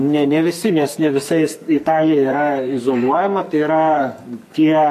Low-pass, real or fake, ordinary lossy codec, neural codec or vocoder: 14.4 kHz; fake; MP3, 64 kbps; codec, 44.1 kHz, 2.6 kbps, SNAC